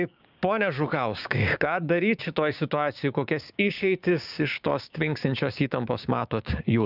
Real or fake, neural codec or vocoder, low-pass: fake; codec, 16 kHz, 6 kbps, DAC; 5.4 kHz